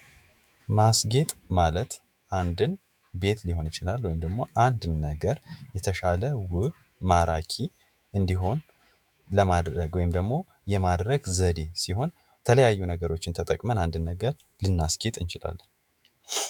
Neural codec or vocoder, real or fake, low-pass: autoencoder, 48 kHz, 128 numbers a frame, DAC-VAE, trained on Japanese speech; fake; 19.8 kHz